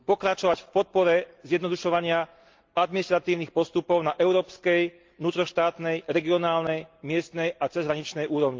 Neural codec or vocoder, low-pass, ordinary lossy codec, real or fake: none; 7.2 kHz; Opus, 24 kbps; real